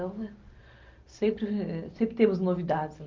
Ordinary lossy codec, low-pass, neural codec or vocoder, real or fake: Opus, 24 kbps; 7.2 kHz; none; real